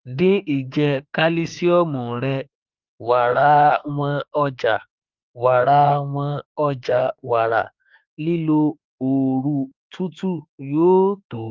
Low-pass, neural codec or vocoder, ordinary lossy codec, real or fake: 7.2 kHz; autoencoder, 48 kHz, 32 numbers a frame, DAC-VAE, trained on Japanese speech; Opus, 32 kbps; fake